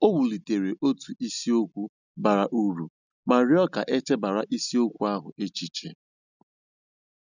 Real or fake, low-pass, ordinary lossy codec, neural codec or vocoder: real; 7.2 kHz; none; none